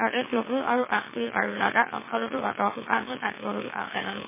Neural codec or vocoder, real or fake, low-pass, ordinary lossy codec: autoencoder, 44.1 kHz, a latent of 192 numbers a frame, MeloTTS; fake; 3.6 kHz; MP3, 16 kbps